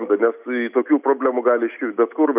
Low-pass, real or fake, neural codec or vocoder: 3.6 kHz; real; none